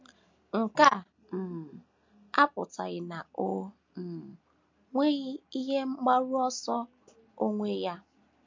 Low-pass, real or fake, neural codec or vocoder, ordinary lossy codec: 7.2 kHz; real; none; MP3, 48 kbps